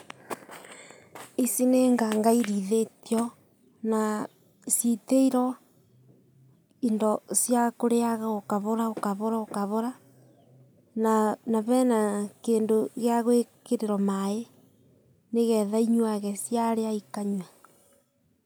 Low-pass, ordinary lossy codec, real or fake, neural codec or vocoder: none; none; real; none